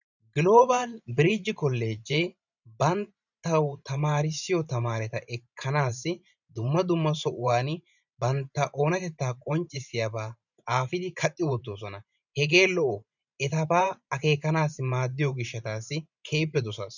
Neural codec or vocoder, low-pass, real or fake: vocoder, 44.1 kHz, 128 mel bands every 512 samples, BigVGAN v2; 7.2 kHz; fake